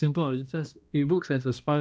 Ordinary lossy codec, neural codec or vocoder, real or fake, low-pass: none; codec, 16 kHz, 1 kbps, X-Codec, HuBERT features, trained on balanced general audio; fake; none